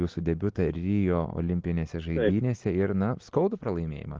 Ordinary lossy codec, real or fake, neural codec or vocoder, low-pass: Opus, 16 kbps; real; none; 7.2 kHz